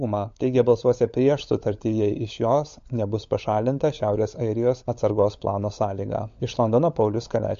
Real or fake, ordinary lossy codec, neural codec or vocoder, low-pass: fake; MP3, 48 kbps; codec, 16 kHz, 16 kbps, FunCodec, trained on LibriTTS, 50 frames a second; 7.2 kHz